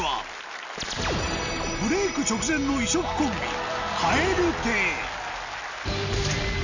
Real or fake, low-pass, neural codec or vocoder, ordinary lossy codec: real; 7.2 kHz; none; none